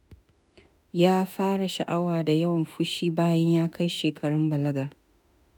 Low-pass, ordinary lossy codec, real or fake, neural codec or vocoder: none; none; fake; autoencoder, 48 kHz, 32 numbers a frame, DAC-VAE, trained on Japanese speech